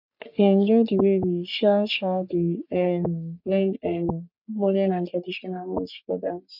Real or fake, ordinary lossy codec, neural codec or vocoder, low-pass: fake; none; codec, 44.1 kHz, 3.4 kbps, Pupu-Codec; 5.4 kHz